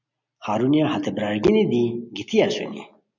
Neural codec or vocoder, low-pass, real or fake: none; 7.2 kHz; real